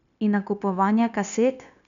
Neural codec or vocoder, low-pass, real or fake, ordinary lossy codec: codec, 16 kHz, 0.9 kbps, LongCat-Audio-Codec; 7.2 kHz; fake; none